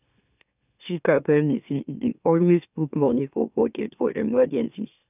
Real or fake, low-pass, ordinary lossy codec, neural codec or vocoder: fake; 3.6 kHz; none; autoencoder, 44.1 kHz, a latent of 192 numbers a frame, MeloTTS